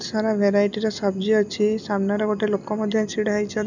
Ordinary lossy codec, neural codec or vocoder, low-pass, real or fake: none; none; 7.2 kHz; real